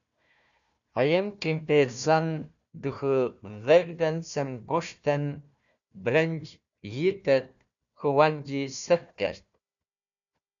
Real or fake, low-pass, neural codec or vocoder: fake; 7.2 kHz; codec, 16 kHz, 1 kbps, FunCodec, trained on Chinese and English, 50 frames a second